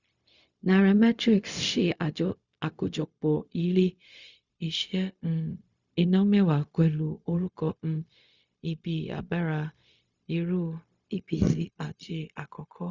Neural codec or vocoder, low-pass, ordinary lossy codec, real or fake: codec, 16 kHz, 0.4 kbps, LongCat-Audio-Codec; 7.2 kHz; Opus, 64 kbps; fake